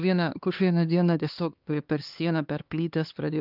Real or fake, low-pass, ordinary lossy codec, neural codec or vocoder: fake; 5.4 kHz; Opus, 24 kbps; codec, 16 kHz, 2 kbps, X-Codec, HuBERT features, trained on LibriSpeech